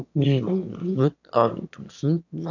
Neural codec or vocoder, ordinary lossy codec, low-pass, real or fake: autoencoder, 22.05 kHz, a latent of 192 numbers a frame, VITS, trained on one speaker; none; 7.2 kHz; fake